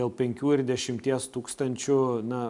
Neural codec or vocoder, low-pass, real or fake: none; 10.8 kHz; real